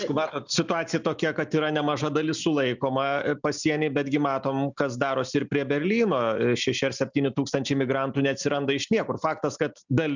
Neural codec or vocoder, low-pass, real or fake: none; 7.2 kHz; real